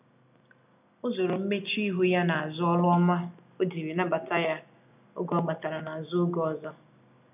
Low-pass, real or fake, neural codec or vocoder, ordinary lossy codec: 3.6 kHz; real; none; none